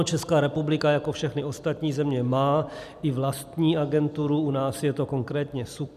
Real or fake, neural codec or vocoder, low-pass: fake; vocoder, 44.1 kHz, 128 mel bands every 256 samples, BigVGAN v2; 14.4 kHz